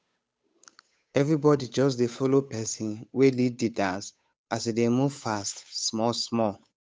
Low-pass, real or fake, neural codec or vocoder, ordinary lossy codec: none; fake; codec, 16 kHz, 8 kbps, FunCodec, trained on Chinese and English, 25 frames a second; none